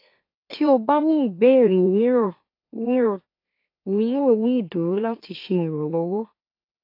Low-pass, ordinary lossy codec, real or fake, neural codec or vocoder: 5.4 kHz; AAC, 32 kbps; fake; autoencoder, 44.1 kHz, a latent of 192 numbers a frame, MeloTTS